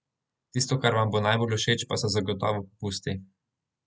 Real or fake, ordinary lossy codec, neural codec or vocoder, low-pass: real; none; none; none